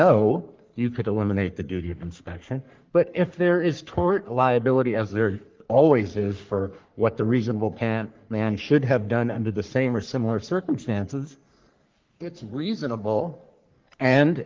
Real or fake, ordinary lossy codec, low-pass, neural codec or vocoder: fake; Opus, 32 kbps; 7.2 kHz; codec, 44.1 kHz, 3.4 kbps, Pupu-Codec